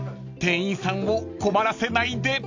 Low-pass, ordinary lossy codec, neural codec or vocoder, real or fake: 7.2 kHz; AAC, 48 kbps; none; real